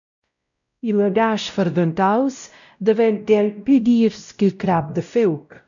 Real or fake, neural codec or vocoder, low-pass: fake; codec, 16 kHz, 0.5 kbps, X-Codec, WavLM features, trained on Multilingual LibriSpeech; 7.2 kHz